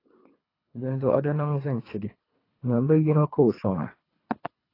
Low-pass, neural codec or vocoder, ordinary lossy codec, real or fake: 5.4 kHz; codec, 24 kHz, 3 kbps, HILCodec; AAC, 24 kbps; fake